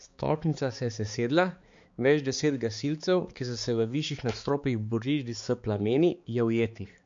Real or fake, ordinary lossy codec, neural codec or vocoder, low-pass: fake; MP3, 48 kbps; codec, 16 kHz, 4 kbps, X-Codec, HuBERT features, trained on balanced general audio; 7.2 kHz